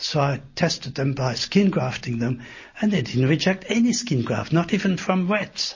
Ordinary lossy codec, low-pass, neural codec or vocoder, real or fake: MP3, 32 kbps; 7.2 kHz; none; real